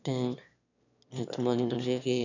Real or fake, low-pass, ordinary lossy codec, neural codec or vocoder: fake; 7.2 kHz; none; autoencoder, 22.05 kHz, a latent of 192 numbers a frame, VITS, trained on one speaker